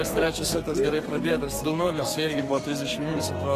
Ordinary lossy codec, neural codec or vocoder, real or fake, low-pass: AAC, 48 kbps; codec, 32 kHz, 1.9 kbps, SNAC; fake; 14.4 kHz